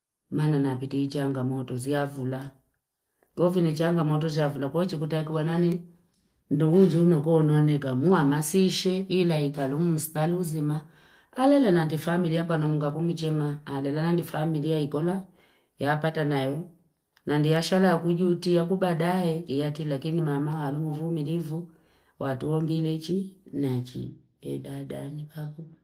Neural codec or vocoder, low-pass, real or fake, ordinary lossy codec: none; 14.4 kHz; real; Opus, 24 kbps